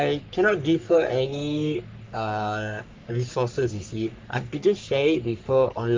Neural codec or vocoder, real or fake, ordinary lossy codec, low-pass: codec, 44.1 kHz, 3.4 kbps, Pupu-Codec; fake; Opus, 24 kbps; 7.2 kHz